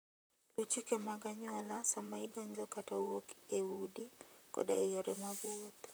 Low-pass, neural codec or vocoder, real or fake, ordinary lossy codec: none; vocoder, 44.1 kHz, 128 mel bands, Pupu-Vocoder; fake; none